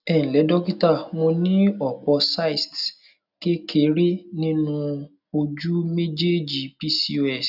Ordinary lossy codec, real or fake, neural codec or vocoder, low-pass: none; real; none; 5.4 kHz